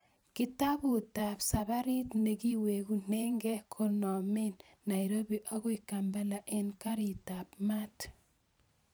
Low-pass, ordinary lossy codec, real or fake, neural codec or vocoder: none; none; fake; vocoder, 44.1 kHz, 128 mel bands every 512 samples, BigVGAN v2